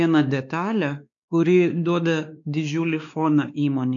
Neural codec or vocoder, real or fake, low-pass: codec, 16 kHz, 2 kbps, X-Codec, WavLM features, trained on Multilingual LibriSpeech; fake; 7.2 kHz